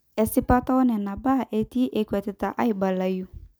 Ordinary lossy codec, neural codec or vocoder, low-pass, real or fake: none; none; none; real